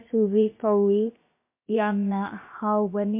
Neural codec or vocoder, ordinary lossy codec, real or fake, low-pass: codec, 16 kHz, about 1 kbps, DyCAST, with the encoder's durations; MP3, 24 kbps; fake; 3.6 kHz